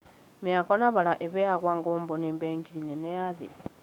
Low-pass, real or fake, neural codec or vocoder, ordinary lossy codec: 19.8 kHz; fake; codec, 44.1 kHz, 7.8 kbps, DAC; none